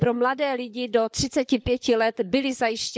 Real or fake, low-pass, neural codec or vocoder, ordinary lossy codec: fake; none; codec, 16 kHz, 16 kbps, FunCodec, trained on LibriTTS, 50 frames a second; none